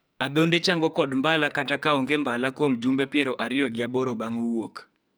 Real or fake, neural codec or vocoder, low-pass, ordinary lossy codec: fake; codec, 44.1 kHz, 2.6 kbps, SNAC; none; none